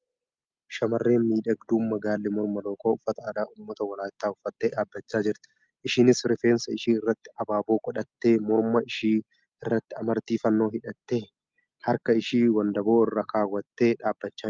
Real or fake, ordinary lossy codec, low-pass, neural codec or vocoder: real; Opus, 24 kbps; 7.2 kHz; none